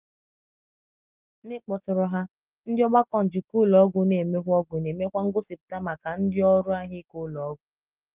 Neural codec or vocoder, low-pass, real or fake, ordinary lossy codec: none; 3.6 kHz; real; Opus, 32 kbps